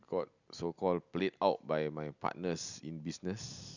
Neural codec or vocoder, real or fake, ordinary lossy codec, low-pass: none; real; none; 7.2 kHz